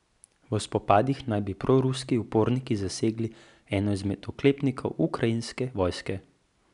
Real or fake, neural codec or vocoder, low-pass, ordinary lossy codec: real; none; 10.8 kHz; none